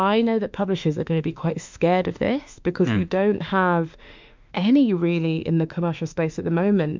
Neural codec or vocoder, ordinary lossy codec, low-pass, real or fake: autoencoder, 48 kHz, 32 numbers a frame, DAC-VAE, trained on Japanese speech; MP3, 64 kbps; 7.2 kHz; fake